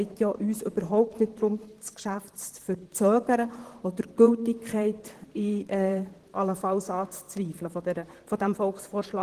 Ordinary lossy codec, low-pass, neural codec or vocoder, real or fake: Opus, 16 kbps; 14.4 kHz; vocoder, 44.1 kHz, 128 mel bands, Pupu-Vocoder; fake